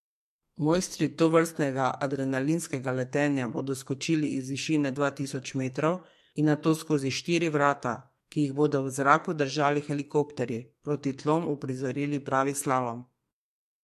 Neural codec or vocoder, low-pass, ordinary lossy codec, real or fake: codec, 44.1 kHz, 2.6 kbps, SNAC; 14.4 kHz; MP3, 64 kbps; fake